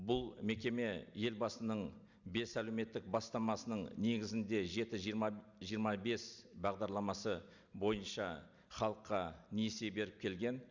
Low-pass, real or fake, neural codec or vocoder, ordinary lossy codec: 7.2 kHz; real; none; Opus, 64 kbps